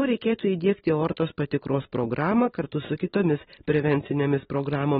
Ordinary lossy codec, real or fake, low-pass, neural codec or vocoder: AAC, 16 kbps; real; 19.8 kHz; none